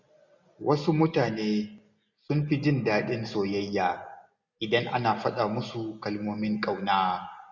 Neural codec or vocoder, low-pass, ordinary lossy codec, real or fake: none; 7.2 kHz; none; real